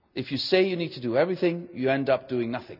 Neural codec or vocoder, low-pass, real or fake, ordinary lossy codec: none; 5.4 kHz; real; none